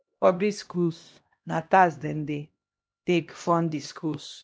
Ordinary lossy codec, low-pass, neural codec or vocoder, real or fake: none; none; codec, 16 kHz, 1 kbps, X-Codec, HuBERT features, trained on LibriSpeech; fake